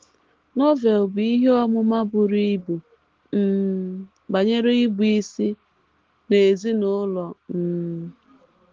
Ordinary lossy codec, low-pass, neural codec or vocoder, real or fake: Opus, 16 kbps; 7.2 kHz; none; real